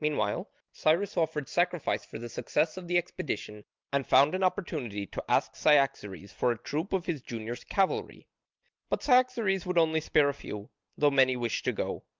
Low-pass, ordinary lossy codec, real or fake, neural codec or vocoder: 7.2 kHz; Opus, 24 kbps; real; none